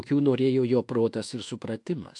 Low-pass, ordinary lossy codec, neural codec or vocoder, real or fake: 10.8 kHz; AAC, 64 kbps; codec, 24 kHz, 1.2 kbps, DualCodec; fake